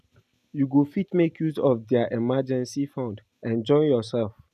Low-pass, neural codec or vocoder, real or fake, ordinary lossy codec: 14.4 kHz; none; real; none